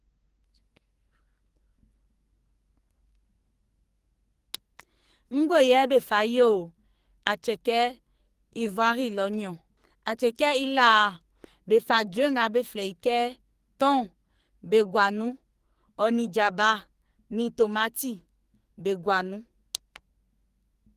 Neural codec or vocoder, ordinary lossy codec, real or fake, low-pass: codec, 44.1 kHz, 2.6 kbps, SNAC; Opus, 24 kbps; fake; 14.4 kHz